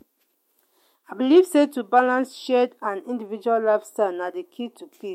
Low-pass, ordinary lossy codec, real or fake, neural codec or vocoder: 19.8 kHz; MP3, 64 kbps; fake; autoencoder, 48 kHz, 128 numbers a frame, DAC-VAE, trained on Japanese speech